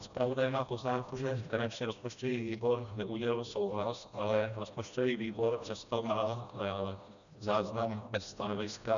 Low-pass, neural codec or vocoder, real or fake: 7.2 kHz; codec, 16 kHz, 1 kbps, FreqCodec, smaller model; fake